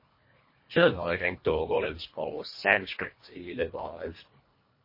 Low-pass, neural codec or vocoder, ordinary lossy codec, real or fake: 5.4 kHz; codec, 24 kHz, 1.5 kbps, HILCodec; MP3, 24 kbps; fake